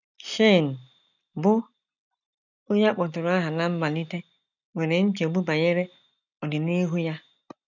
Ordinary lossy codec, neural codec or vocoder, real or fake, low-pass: none; none; real; 7.2 kHz